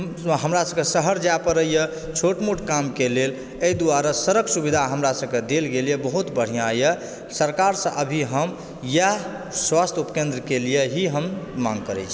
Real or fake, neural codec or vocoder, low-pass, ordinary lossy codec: real; none; none; none